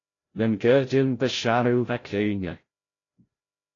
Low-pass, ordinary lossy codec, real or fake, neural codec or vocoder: 7.2 kHz; AAC, 32 kbps; fake; codec, 16 kHz, 0.5 kbps, FreqCodec, larger model